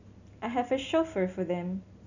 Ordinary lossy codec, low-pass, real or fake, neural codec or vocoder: none; 7.2 kHz; real; none